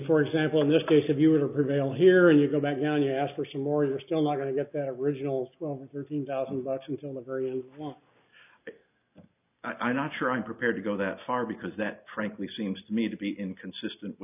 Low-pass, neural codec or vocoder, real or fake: 3.6 kHz; none; real